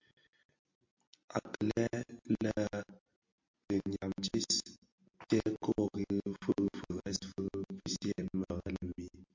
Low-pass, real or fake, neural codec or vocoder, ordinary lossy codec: 7.2 kHz; real; none; MP3, 48 kbps